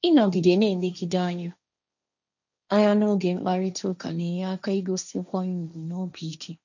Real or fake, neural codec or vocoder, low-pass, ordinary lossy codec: fake; codec, 16 kHz, 1.1 kbps, Voila-Tokenizer; 7.2 kHz; none